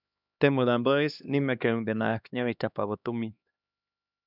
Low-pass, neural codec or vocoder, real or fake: 5.4 kHz; codec, 16 kHz, 2 kbps, X-Codec, HuBERT features, trained on LibriSpeech; fake